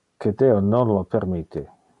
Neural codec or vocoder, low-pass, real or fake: none; 10.8 kHz; real